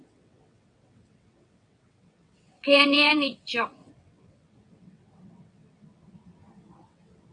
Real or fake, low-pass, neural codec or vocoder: fake; 9.9 kHz; vocoder, 22.05 kHz, 80 mel bands, WaveNeXt